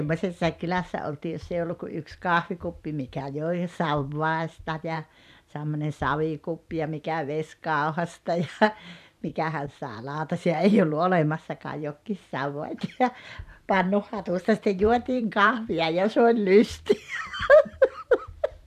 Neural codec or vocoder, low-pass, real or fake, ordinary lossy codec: vocoder, 44.1 kHz, 128 mel bands every 512 samples, BigVGAN v2; 14.4 kHz; fake; none